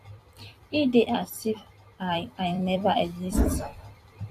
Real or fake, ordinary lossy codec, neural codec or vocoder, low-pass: fake; AAC, 64 kbps; vocoder, 44.1 kHz, 128 mel bands every 256 samples, BigVGAN v2; 14.4 kHz